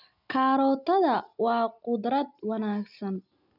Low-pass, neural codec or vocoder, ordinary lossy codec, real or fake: 5.4 kHz; none; none; real